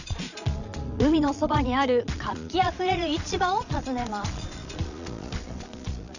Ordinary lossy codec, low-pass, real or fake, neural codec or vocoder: none; 7.2 kHz; fake; vocoder, 22.05 kHz, 80 mel bands, Vocos